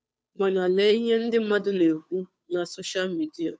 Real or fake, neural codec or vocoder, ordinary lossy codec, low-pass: fake; codec, 16 kHz, 2 kbps, FunCodec, trained on Chinese and English, 25 frames a second; none; none